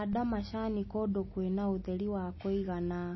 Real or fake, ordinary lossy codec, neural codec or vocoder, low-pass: real; MP3, 24 kbps; none; 7.2 kHz